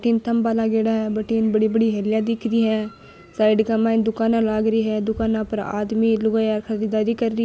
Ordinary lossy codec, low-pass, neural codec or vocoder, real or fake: none; none; none; real